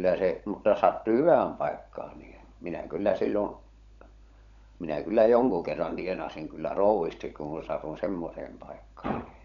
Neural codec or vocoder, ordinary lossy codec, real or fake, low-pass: codec, 16 kHz, 16 kbps, FunCodec, trained on LibriTTS, 50 frames a second; none; fake; 7.2 kHz